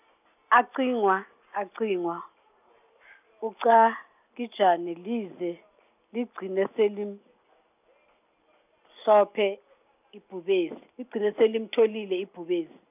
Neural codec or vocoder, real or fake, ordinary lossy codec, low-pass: none; real; none; 3.6 kHz